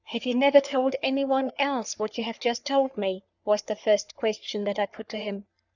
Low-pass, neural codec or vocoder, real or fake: 7.2 kHz; codec, 44.1 kHz, 3.4 kbps, Pupu-Codec; fake